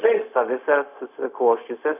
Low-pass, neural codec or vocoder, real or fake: 3.6 kHz; codec, 16 kHz, 0.4 kbps, LongCat-Audio-Codec; fake